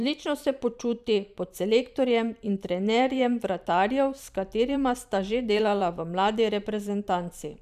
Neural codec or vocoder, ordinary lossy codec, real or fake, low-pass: vocoder, 44.1 kHz, 128 mel bands every 512 samples, BigVGAN v2; none; fake; 14.4 kHz